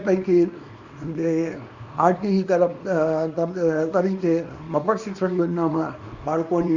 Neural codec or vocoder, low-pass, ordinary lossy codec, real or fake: codec, 24 kHz, 0.9 kbps, WavTokenizer, small release; 7.2 kHz; Opus, 64 kbps; fake